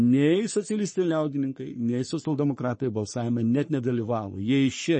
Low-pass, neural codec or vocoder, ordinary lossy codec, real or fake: 10.8 kHz; codec, 44.1 kHz, 3.4 kbps, Pupu-Codec; MP3, 32 kbps; fake